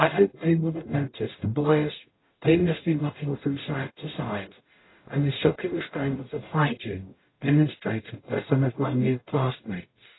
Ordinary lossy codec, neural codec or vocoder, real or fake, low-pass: AAC, 16 kbps; codec, 44.1 kHz, 0.9 kbps, DAC; fake; 7.2 kHz